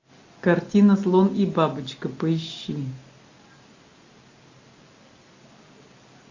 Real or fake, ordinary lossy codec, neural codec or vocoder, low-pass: real; AAC, 48 kbps; none; 7.2 kHz